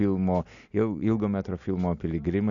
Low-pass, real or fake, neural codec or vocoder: 7.2 kHz; real; none